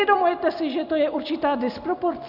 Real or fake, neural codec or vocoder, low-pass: real; none; 5.4 kHz